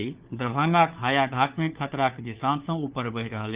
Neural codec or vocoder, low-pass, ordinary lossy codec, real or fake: codec, 16 kHz, 4 kbps, FunCodec, trained on LibriTTS, 50 frames a second; 3.6 kHz; Opus, 32 kbps; fake